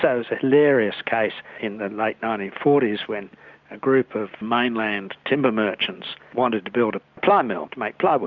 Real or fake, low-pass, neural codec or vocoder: real; 7.2 kHz; none